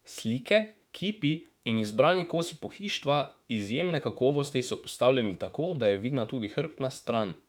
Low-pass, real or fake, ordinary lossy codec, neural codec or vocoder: 19.8 kHz; fake; none; autoencoder, 48 kHz, 32 numbers a frame, DAC-VAE, trained on Japanese speech